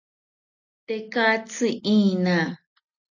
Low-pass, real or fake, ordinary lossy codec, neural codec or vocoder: 7.2 kHz; real; AAC, 48 kbps; none